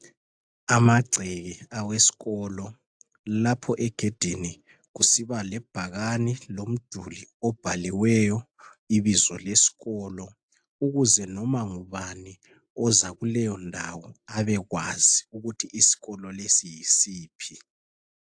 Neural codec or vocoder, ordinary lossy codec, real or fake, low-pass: none; AAC, 64 kbps; real; 9.9 kHz